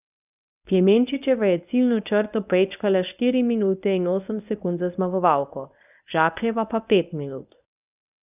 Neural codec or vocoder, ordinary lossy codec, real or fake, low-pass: codec, 24 kHz, 0.9 kbps, WavTokenizer, medium speech release version 1; none; fake; 3.6 kHz